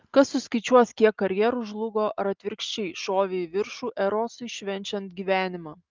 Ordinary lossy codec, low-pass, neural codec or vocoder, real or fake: Opus, 32 kbps; 7.2 kHz; none; real